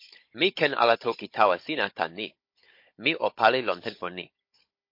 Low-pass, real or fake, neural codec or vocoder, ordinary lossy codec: 5.4 kHz; fake; codec, 16 kHz, 16 kbps, FunCodec, trained on Chinese and English, 50 frames a second; MP3, 32 kbps